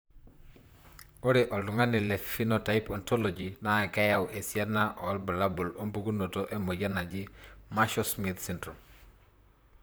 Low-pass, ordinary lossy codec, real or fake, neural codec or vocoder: none; none; fake; vocoder, 44.1 kHz, 128 mel bands, Pupu-Vocoder